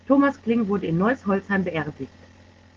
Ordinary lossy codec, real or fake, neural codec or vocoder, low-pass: Opus, 16 kbps; real; none; 7.2 kHz